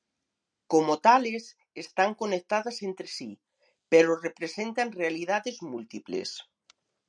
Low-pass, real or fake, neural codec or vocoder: 9.9 kHz; real; none